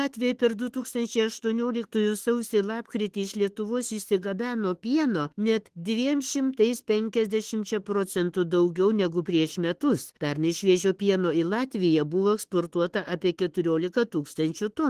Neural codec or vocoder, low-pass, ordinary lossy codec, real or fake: autoencoder, 48 kHz, 32 numbers a frame, DAC-VAE, trained on Japanese speech; 14.4 kHz; Opus, 16 kbps; fake